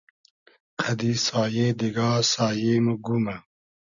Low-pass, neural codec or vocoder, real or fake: 7.2 kHz; none; real